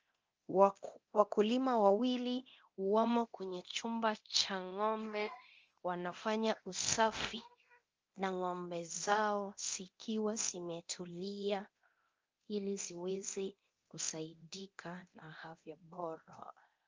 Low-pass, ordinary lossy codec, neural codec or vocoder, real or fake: 7.2 kHz; Opus, 24 kbps; codec, 24 kHz, 0.9 kbps, DualCodec; fake